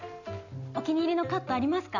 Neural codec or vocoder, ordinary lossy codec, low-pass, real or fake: none; none; 7.2 kHz; real